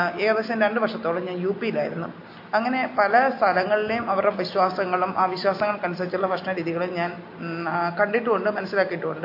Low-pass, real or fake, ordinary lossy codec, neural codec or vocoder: 5.4 kHz; real; MP3, 32 kbps; none